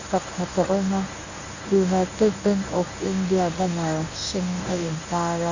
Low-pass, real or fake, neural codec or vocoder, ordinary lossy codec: 7.2 kHz; fake; codec, 24 kHz, 0.9 kbps, WavTokenizer, medium speech release version 1; none